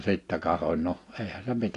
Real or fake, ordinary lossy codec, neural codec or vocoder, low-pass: real; AAC, 48 kbps; none; 10.8 kHz